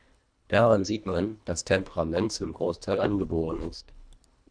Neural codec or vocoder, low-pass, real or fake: codec, 24 kHz, 1.5 kbps, HILCodec; 9.9 kHz; fake